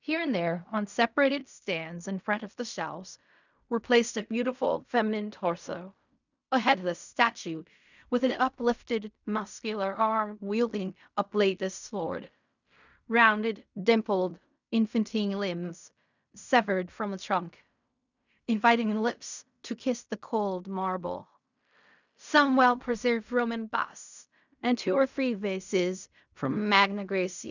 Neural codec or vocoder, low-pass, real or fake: codec, 16 kHz in and 24 kHz out, 0.4 kbps, LongCat-Audio-Codec, fine tuned four codebook decoder; 7.2 kHz; fake